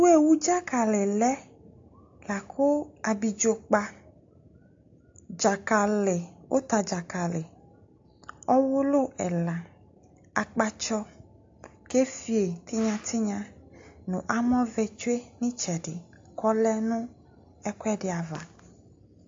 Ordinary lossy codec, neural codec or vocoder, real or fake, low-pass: AAC, 48 kbps; none; real; 7.2 kHz